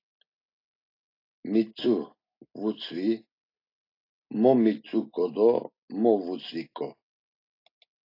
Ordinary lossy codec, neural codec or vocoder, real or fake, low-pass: AAC, 32 kbps; none; real; 5.4 kHz